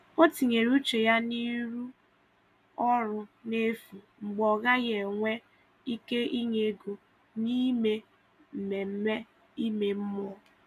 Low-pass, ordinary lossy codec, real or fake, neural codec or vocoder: 14.4 kHz; none; real; none